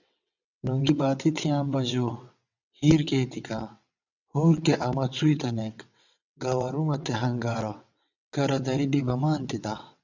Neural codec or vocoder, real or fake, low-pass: vocoder, 22.05 kHz, 80 mel bands, WaveNeXt; fake; 7.2 kHz